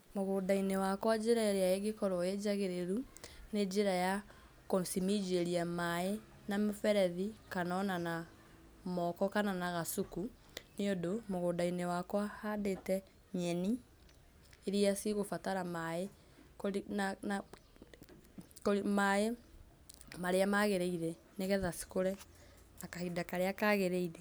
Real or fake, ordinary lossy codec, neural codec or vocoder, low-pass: real; none; none; none